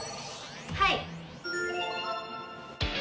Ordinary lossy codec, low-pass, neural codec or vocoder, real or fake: none; none; none; real